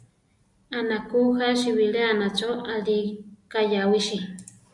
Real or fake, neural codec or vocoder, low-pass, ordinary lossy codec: real; none; 10.8 kHz; MP3, 96 kbps